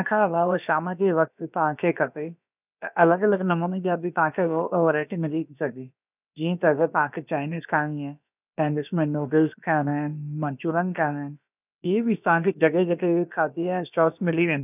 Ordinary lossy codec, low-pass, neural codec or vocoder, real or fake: none; 3.6 kHz; codec, 16 kHz, about 1 kbps, DyCAST, with the encoder's durations; fake